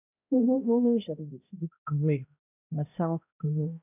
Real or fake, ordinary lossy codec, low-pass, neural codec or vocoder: fake; none; 3.6 kHz; codec, 16 kHz, 0.5 kbps, X-Codec, HuBERT features, trained on balanced general audio